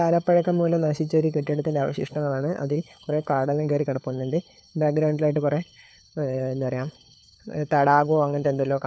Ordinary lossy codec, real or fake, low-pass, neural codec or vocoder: none; fake; none; codec, 16 kHz, 4 kbps, FunCodec, trained on LibriTTS, 50 frames a second